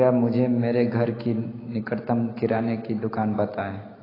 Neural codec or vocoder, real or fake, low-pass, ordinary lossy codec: none; real; 5.4 kHz; AAC, 24 kbps